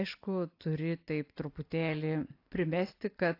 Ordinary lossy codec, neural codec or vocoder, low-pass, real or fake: AAC, 32 kbps; none; 5.4 kHz; real